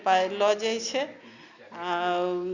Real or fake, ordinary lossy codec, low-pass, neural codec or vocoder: real; Opus, 64 kbps; 7.2 kHz; none